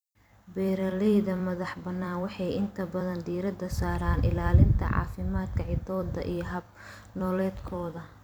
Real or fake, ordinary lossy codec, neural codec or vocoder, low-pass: fake; none; vocoder, 44.1 kHz, 128 mel bands every 256 samples, BigVGAN v2; none